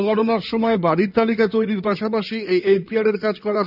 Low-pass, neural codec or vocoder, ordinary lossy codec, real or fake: 5.4 kHz; codec, 16 kHz in and 24 kHz out, 2.2 kbps, FireRedTTS-2 codec; none; fake